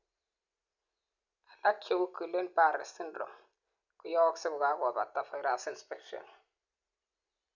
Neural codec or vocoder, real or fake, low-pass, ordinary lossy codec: none; real; 7.2 kHz; none